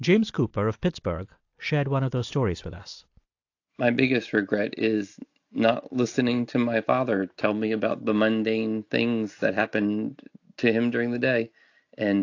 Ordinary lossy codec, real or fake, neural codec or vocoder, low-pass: AAC, 48 kbps; real; none; 7.2 kHz